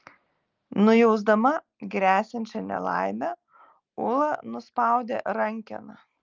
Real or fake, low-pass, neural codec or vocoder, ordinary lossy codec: real; 7.2 kHz; none; Opus, 32 kbps